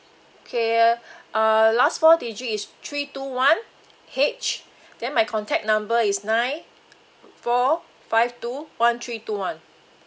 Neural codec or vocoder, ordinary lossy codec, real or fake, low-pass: none; none; real; none